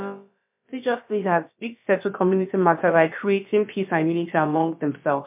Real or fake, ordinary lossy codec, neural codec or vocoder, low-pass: fake; MP3, 32 kbps; codec, 16 kHz, about 1 kbps, DyCAST, with the encoder's durations; 3.6 kHz